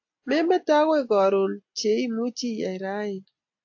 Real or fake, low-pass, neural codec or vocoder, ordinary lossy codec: real; 7.2 kHz; none; AAC, 48 kbps